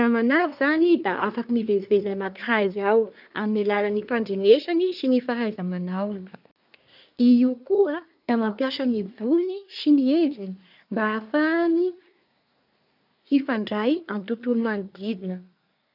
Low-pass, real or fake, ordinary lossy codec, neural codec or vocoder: 5.4 kHz; fake; none; codec, 24 kHz, 1 kbps, SNAC